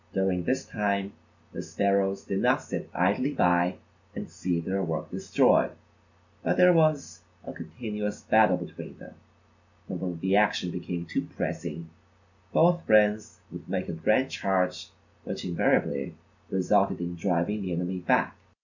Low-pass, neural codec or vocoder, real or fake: 7.2 kHz; none; real